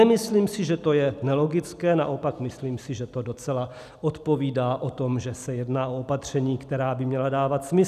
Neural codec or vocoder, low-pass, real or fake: none; 14.4 kHz; real